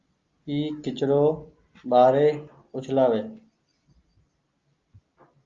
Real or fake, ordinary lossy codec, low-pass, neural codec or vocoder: real; Opus, 32 kbps; 7.2 kHz; none